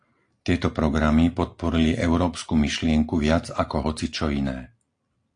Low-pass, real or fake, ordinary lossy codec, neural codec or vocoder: 9.9 kHz; real; AAC, 48 kbps; none